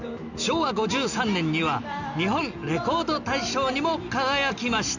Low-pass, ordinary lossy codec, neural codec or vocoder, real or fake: 7.2 kHz; none; none; real